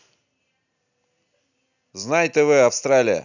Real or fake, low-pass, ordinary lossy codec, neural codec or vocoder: real; 7.2 kHz; none; none